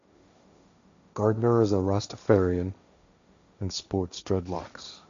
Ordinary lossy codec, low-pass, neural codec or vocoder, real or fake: MP3, 64 kbps; 7.2 kHz; codec, 16 kHz, 1.1 kbps, Voila-Tokenizer; fake